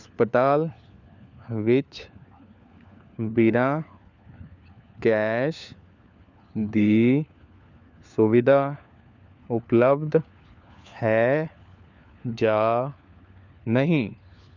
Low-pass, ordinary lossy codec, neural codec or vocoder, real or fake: 7.2 kHz; none; codec, 16 kHz, 4 kbps, FunCodec, trained on LibriTTS, 50 frames a second; fake